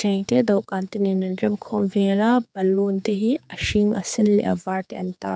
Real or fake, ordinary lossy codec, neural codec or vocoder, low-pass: fake; none; codec, 16 kHz, 4 kbps, X-Codec, HuBERT features, trained on general audio; none